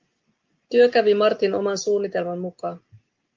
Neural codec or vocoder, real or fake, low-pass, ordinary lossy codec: none; real; 7.2 kHz; Opus, 32 kbps